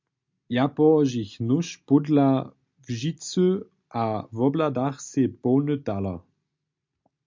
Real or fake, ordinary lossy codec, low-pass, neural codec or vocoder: real; MP3, 64 kbps; 7.2 kHz; none